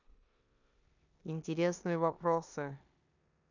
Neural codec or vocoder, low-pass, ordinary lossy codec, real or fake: codec, 16 kHz in and 24 kHz out, 0.9 kbps, LongCat-Audio-Codec, four codebook decoder; 7.2 kHz; none; fake